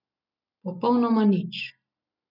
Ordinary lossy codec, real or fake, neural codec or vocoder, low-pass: none; real; none; 5.4 kHz